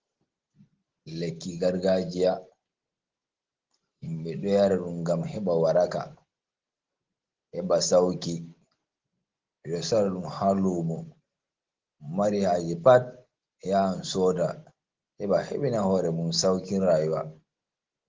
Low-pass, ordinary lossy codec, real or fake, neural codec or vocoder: 7.2 kHz; Opus, 16 kbps; real; none